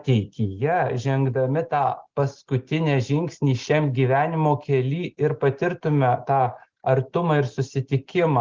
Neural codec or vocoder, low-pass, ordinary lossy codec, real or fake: none; 7.2 kHz; Opus, 16 kbps; real